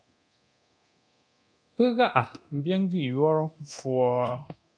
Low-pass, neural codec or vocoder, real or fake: 9.9 kHz; codec, 24 kHz, 0.9 kbps, DualCodec; fake